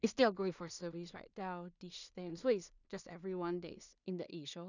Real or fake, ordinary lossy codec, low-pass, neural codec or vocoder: fake; none; 7.2 kHz; codec, 16 kHz in and 24 kHz out, 0.4 kbps, LongCat-Audio-Codec, two codebook decoder